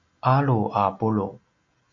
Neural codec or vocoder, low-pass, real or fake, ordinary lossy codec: none; 7.2 kHz; real; AAC, 48 kbps